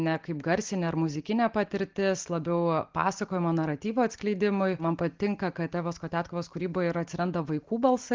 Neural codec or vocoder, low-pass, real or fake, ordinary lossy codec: none; 7.2 kHz; real; Opus, 16 kbps